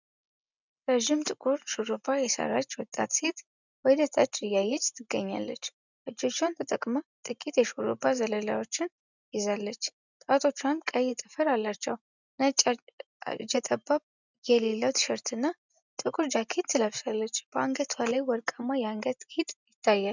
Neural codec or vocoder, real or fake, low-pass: none; real; 7.2 kHz